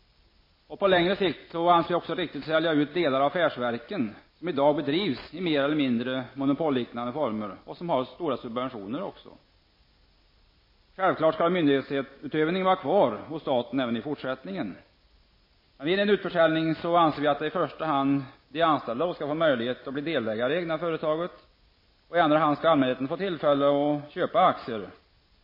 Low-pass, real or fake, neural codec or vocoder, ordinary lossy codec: 5.4 kHz; real; none; MP3, 24 kbps